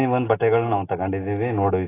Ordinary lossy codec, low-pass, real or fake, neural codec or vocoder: AAC, 16 kbps; 3.6 kHz; real; none